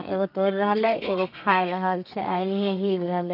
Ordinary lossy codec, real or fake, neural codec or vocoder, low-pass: none; fake; codec, 32 kHz, 1.9 kbps, SNAC; 5.4 kHz